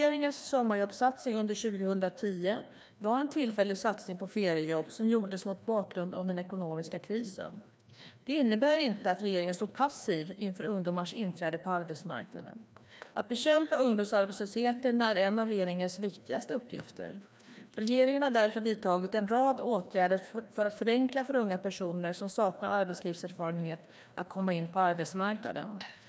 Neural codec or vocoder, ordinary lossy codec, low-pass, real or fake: codec, 16 kHz, 1 kbps, FreqCodec, larger model; none; none; fake